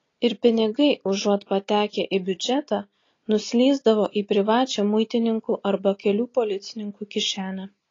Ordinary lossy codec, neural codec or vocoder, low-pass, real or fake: AAC, 32 kbps; none; 7.2 kHz; real